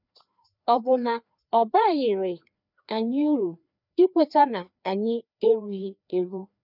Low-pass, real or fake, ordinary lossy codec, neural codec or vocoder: 5.4 kHz; fake; none; codec, 16 kHz, 2 kbps, FreqCodec, larger model